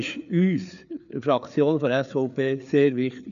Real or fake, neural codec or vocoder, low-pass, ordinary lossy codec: fake; codec, 16 kHz, 4 kbps, FreqCodec, larger model; 7.2 kHz; none